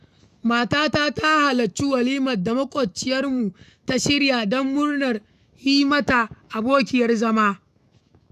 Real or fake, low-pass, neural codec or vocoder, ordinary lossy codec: fake; 14.4 kHz; autoencoder, 48 kHz, 128 numbers a frame, DAC-VAE, trained on Japanese speech; none